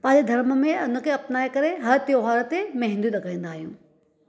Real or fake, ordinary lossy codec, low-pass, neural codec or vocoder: real; none; none; none